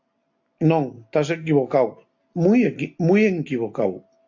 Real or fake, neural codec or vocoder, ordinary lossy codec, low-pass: real; none; AAC, 48 kbps; 7.2 kHz